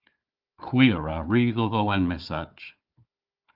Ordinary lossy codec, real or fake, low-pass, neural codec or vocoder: Opus, 32 kbps; fake; 5.4 kHz; codec, 16 kHz, 4 kbps, FunCodec, trained on Chinese and English, 50 frames a second